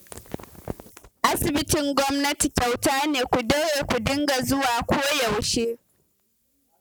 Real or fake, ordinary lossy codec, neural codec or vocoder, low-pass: real; none; none; none